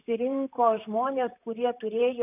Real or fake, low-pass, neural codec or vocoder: fake; 3.6 kHz; vocoder, 44.1 kHz, 128 mel bands, Pupu-Vocoder